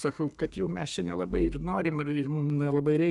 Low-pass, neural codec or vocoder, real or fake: 10.8 kHz; codec, 32 kHz, 1.9 kbps, SNAC; fake